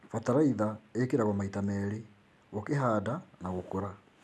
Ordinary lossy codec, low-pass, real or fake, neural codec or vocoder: none; none; real; none